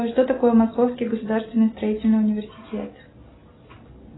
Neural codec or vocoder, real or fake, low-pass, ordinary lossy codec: none; real; 7.2 kHz; AAC, 16 kbps